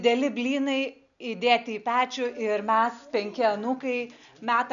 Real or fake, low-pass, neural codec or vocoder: real; 7.2 kHz; none